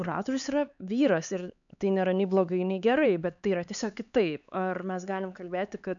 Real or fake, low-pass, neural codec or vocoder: fake; 7.2 kHz; codec, 16 kHz, 4 kbps, X-Codec, HuBERT features, trained on LibriSpeech